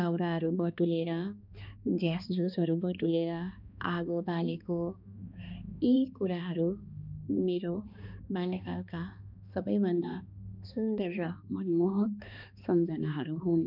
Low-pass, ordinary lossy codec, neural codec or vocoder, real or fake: 5.4 kHz; none; codec, 16 kHz, 2 kbps, X-Codec, HuBERT features, trained on balanced general audio; fake